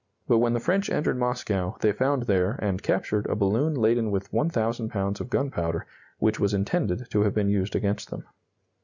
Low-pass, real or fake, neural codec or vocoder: 7.2 kHz; real; none